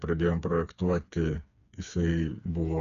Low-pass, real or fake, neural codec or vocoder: 7.2 kHz; fake; codec, 16 kHz, 4 kbps, FreqCodec, smaller model